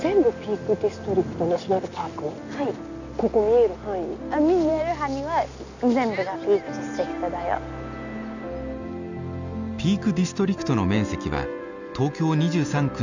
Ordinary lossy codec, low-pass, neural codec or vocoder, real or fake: none; 7.2 kHz; none; real